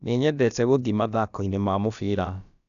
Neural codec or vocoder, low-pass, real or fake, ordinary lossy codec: codec, 16 kHz, about 1 kbps, DyCAST, with the encoder's durations; 7.2 kHz; fake; none